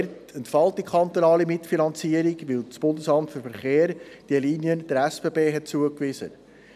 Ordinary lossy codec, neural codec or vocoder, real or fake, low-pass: none; none; real; 14.4 kHz